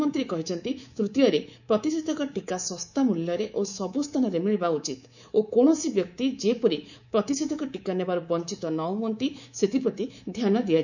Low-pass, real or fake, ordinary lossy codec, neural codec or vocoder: 7.2 kHz; fake; none; codec, 24 kHz, 3.1 kbps, DualCodec